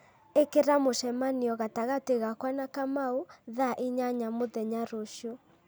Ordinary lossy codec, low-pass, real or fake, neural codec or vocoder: none; none; real; none